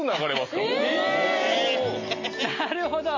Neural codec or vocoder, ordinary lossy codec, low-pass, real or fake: none; MP3, 32 kbps; 7.2 kHz; real